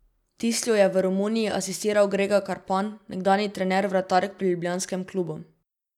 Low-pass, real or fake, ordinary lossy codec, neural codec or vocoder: 19.8 kHz; real; none; none